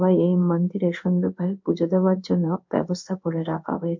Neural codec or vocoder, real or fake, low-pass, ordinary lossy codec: codec, 16 kHz in and 24 kHz out, 1 kbps, XY-Tokenizer; fake; 7.2 kHz; MP3, 48 kbps